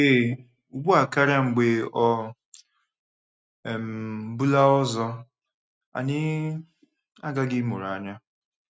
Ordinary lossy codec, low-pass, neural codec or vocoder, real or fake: none; none; none; real